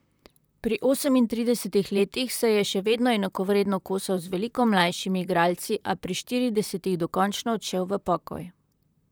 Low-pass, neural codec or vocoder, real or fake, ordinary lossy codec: none; vocoder, 44.1 kHz, 128 mel bands, Pupu-Vocoder; fake; none